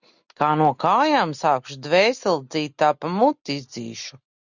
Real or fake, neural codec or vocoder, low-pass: real; none; 7.2 kHz